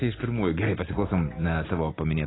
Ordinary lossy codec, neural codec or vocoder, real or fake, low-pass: AAC, 16 kbps; none; real; 7.2 kHz